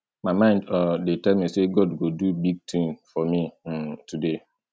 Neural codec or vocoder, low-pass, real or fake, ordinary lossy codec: none; none; real; none